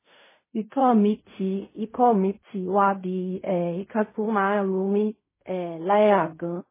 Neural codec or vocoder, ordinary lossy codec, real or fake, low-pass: codec, 16 kHz in and 24 kHz out, 0.4 kbps, LongCat-Audio-Codec, fine tuned four codebook decoder; MP3, 16 kbps; fake; 3.6 kHz